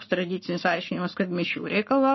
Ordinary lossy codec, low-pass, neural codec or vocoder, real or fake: MP3, 24 kbps; 7.2 kHz; codec, 44.1 kHz, 7.8 kbps, Pupu-Codec; fake